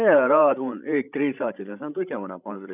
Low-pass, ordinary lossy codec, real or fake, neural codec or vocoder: 3.6 kHz; none; fake; codec, 16 kHz, 16 kbps, FreqCodec, larger model